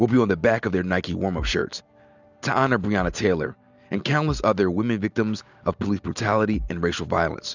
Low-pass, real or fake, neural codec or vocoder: 7.2 kHz; real; none